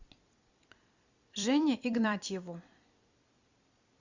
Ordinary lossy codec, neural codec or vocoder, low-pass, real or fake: Opus, 64 kbps; vocoder, 44.1 kHz, 128 mel bands every 256 samples, BigVGAN v2; 7.2 kHz; fake